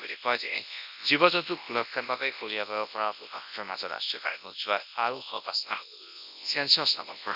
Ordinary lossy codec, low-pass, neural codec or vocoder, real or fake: none; 5.4 kHz; codec, 24 kHz, 0.9 kbps, WavTokenizer, large speech release; fake